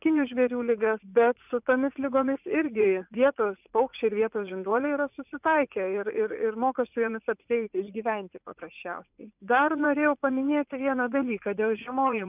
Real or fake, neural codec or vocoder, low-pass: fake; vocoder, 44.1 kHz, 80 mel bands, Vocos; 3.6 kHz